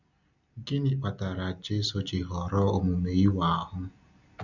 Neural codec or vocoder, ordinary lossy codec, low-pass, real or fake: none; none; 7.2 kHz; real